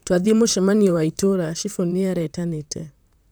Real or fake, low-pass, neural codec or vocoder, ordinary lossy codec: fake; none; vocoder, 44.1 kHz, 128 mel bands, Pupu-Vocoder; none